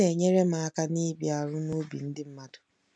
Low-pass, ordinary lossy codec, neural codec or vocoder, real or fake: none; none; none; real